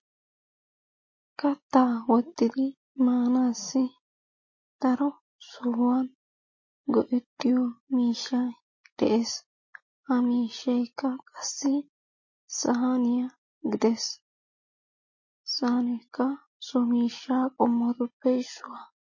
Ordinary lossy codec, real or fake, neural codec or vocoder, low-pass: MP3, 32 kbps; real; none; 7.2 kHz